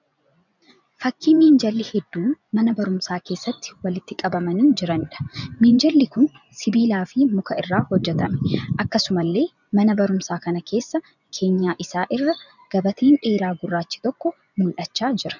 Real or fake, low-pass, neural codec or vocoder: fake; 7.2 kHz; vocoder, 24 kHz, 100 mel bands, Vocos